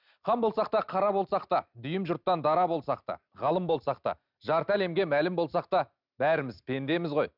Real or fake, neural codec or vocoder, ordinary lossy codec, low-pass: real; none; none; 5.4 kHz